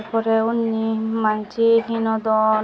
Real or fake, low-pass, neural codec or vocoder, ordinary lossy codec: real; none; none; none